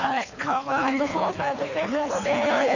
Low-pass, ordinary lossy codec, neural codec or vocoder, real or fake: 7.2 kHz; none; codec, 24 kHz, 3 kbps, HILCodec; fake